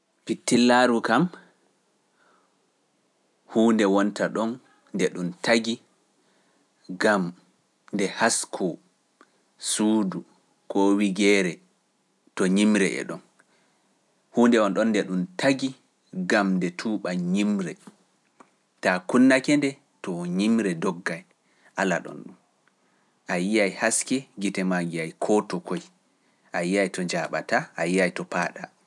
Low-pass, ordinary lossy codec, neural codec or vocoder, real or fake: none; none; none; real